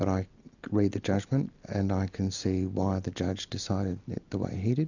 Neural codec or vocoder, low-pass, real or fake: none; 7.2 kHz; real